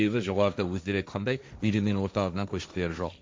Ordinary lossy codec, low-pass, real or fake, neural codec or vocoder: none; none; fake; codec, 16 kHz, 1.1 kbps, Voila-Tokenizer